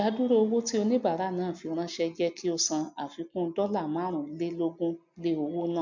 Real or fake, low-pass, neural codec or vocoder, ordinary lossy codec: real; 7.2 kHz; none; MP3, 64 kbps